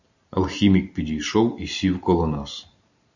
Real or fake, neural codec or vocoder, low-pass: real; none; 7.2 kHz